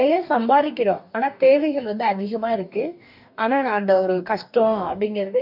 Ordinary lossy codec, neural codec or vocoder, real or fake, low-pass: none; codec, 44.1 kHz, 2.6 kbps, DAC; fake; 5.4 kHz